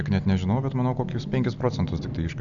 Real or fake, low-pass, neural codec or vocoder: real; 7.2 kHz; none